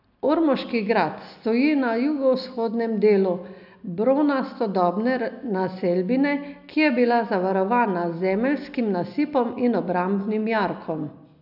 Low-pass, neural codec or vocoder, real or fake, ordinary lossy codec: 5.4 kHz; none; real; none